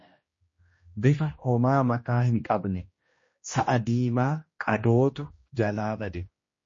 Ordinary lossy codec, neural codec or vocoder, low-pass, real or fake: MP3, 32 kbps; codec, 16 kHz, 1 kbps, X-Codec, HuBERT features, trained on general audio; 7.2 kHz; fake